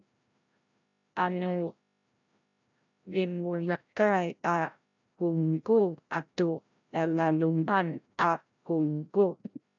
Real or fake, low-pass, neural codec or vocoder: fake; 7.2 kHz; codec, 16 kHz, 0.5 kbps, FreqCodec, larger model